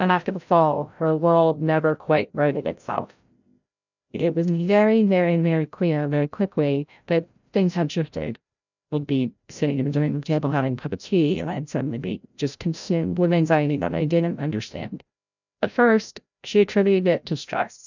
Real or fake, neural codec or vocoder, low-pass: fake; codec, 16 kHz, 0.5 kbps, FreqCodec, larger model; 7.2 kHz